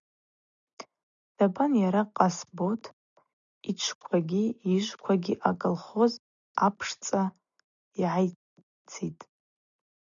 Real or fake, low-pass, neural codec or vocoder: real; 7.2 kHz; none